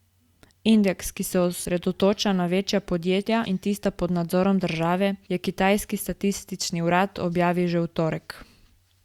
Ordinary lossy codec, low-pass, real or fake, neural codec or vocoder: Opus, 64 kbps; 19.8 kHz; real; none